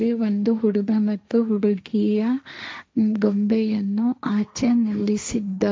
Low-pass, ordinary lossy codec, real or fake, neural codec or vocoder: none; none; fake; codec, 16 kHz, 1.1 kbps, Voila-Tokenizer